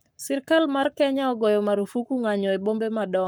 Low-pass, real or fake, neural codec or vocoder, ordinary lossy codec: none; fake; codec, 44.1 kHz, 7.8 kbps, Pupu-Codec; none